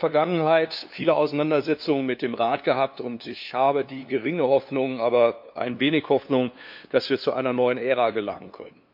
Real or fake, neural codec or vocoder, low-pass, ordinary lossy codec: fake; codec, 16 kHz, 2 kbps, FunCodec, trained on LibriTTS, 25 frames a second; 5.4 kHz; MP3, 48 kbps